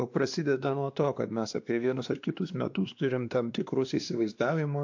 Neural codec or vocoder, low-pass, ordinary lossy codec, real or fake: codec, 16 kHz, 2 kbps, X-Codec, WavLM features, trained on Multilingual LibriSpeech; 7.2 kHz; AAC, 48 kbps; fake